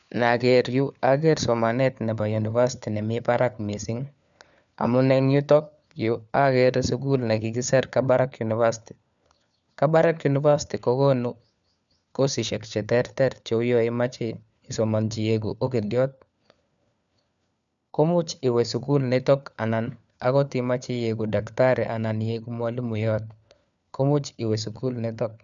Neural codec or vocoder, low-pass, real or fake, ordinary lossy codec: codec, 16 kHz, 4 kbps, FunCodec, trained on LibriTTS, 50 frames a second; 7.2 kHz; fake; none